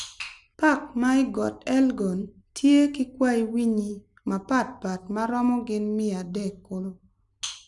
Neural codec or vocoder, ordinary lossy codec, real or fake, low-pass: none; none; real; 10.8 kHz